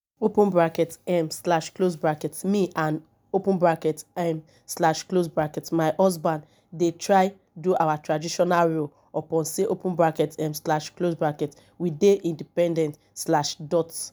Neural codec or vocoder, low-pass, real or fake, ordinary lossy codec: none; none; real; none